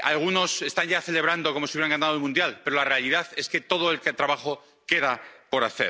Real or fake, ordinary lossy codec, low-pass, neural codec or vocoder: real; none; none; none